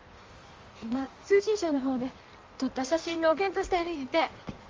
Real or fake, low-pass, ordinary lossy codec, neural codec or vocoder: fake; 7.2 kHz; Opus, 32 kbps; codec, 16 kHz in and 24 kHz out, 1.1 kbps, FireRedTTS-2 codec